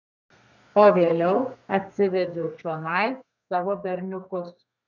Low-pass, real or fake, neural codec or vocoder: 7.2 kHz; fake; codec, 32 kHz, 1.9 kbps, SNAC